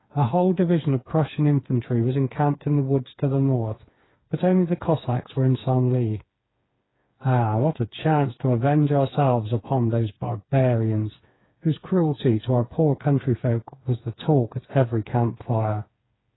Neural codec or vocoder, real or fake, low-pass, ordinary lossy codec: codec, 16 kHz, 4 kbps, FreqCodec, smaller model; fake; 7.2 kHz; AAC, 16 kbps